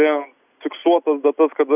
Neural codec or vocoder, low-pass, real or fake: none; 3.6 kHz; real